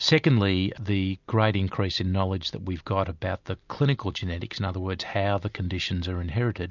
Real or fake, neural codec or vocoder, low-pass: real; none; 7.2 kHz